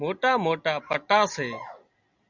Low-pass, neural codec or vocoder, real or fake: 7.2 kHz; none; real